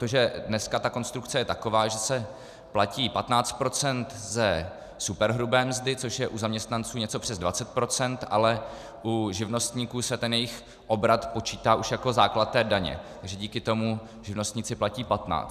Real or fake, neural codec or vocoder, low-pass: real; none; 14.4 kHz